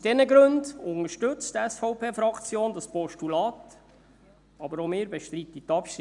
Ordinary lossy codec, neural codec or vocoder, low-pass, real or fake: none; none; 10.8 kHz; real